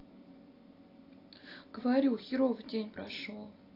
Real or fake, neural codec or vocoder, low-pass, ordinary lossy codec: real; none; 5.4 kHz; AAC, 32 kbps